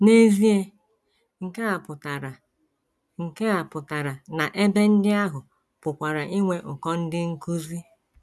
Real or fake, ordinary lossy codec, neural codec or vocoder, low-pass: real; none; none; none